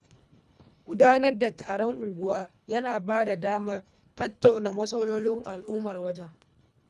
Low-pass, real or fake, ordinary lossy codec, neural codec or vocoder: none; fake; none; codec, 24 kHz, 1.5 kbps, HILCodec